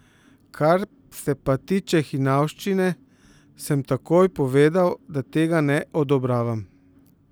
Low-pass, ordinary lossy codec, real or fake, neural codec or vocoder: none; none; real; none